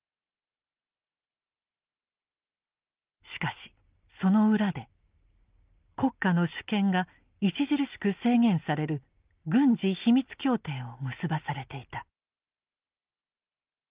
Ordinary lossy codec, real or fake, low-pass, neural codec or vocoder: Opus, 32 kbps; real; 3.6 kHz; none